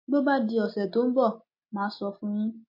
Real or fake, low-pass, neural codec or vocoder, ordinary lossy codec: real; 5.4 kHz; none; MP3, 32 kbps